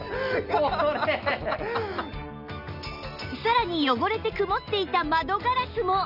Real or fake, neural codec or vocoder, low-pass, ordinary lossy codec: real; none; 5.4 kHz; none